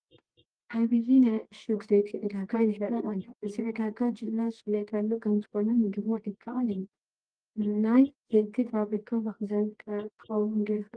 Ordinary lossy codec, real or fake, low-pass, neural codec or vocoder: Opus, 24 kbps; fake; 9.9 kHz; codec, 24 kHz, 0.9 kbps, WavTokenizer, medium music audio release